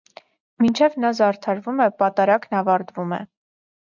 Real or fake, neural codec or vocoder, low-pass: real; none; 7.2 kHz